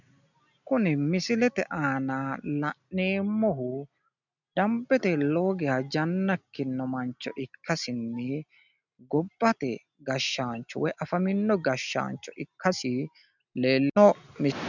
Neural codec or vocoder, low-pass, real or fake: none; 7.2 kHz; real